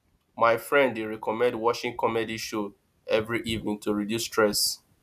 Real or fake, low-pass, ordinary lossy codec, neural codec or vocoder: real; 14.4 kHz; none; none